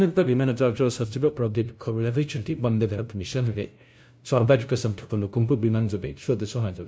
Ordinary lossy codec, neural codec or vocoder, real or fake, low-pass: none; codec, 16 kHz, 0.5 kbps, FunCodec, trained on LibriTTS, 25 frames a second; fake; none